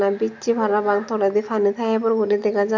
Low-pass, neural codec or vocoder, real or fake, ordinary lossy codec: 7.2 kHz; none; real; none